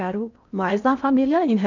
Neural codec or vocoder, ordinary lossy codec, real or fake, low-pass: codec, 16 kHz in and 24 kHz out, 0.8 kbps, FocalCodec, streaming, 65536 codes; none; fake; 7.2 kHz